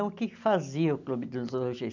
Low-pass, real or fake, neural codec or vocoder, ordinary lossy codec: 7.2 kHz; real; none; none